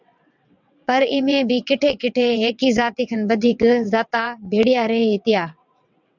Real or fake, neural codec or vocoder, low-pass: fake; vocoder, 22.05 kHz, 80 mel bands, WaveNeXt; 7.2 kHz